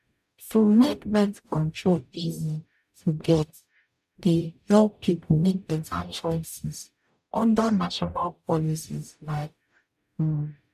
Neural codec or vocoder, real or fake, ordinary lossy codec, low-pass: codec, 44.1 kHz, 0.9 kbps, DAC; fake; none; 14.4 kHz